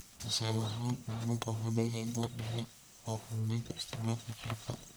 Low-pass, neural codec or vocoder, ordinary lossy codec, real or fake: none; codec, 44.1 kHz, 1.7 kbps, Pupu-Codec; none; fake